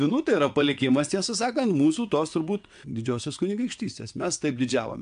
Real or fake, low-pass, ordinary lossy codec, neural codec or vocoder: fake; 9.9 kHz; AAC, 64 kbps; vocoder, 22.05 kHz, 80 mel bands, WaveNeXt